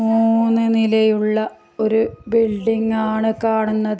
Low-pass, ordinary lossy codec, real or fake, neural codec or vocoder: none; none; real; none